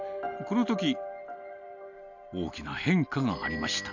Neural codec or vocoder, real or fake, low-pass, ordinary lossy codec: none; real; 7.2 kHz; none